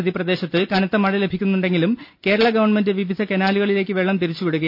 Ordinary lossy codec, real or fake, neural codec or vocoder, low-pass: none; real; none; 5.4 kHz